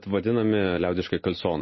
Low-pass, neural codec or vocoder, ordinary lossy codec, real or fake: 7.2 kHz; none; MP3, 24 kbps; real